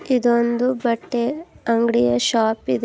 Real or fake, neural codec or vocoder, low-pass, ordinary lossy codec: real; none; none; none